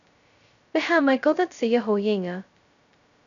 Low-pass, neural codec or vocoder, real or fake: 7.2 kHz; codec, 16 kHz, 0.2 kbps, FocalCodec; fake